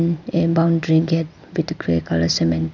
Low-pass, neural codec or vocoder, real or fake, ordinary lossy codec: 7.2 kHz; none; real; none